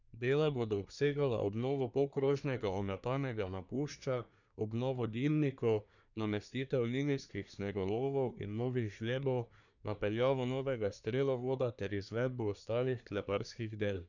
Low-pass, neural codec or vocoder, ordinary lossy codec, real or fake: 7.2 kHz; codec, 24 kHz, 1 kbps, SNAC; none; fake